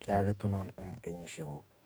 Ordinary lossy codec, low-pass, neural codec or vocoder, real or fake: none; none; codec, 44.1 kHz, 2.6 kbps, DAC; fake